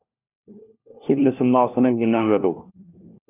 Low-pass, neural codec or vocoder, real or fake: 3.6 kHz; codec, 16 kHz, 1 kbps, FunCodec, trained on LibriTTS, 50 frames a second; fake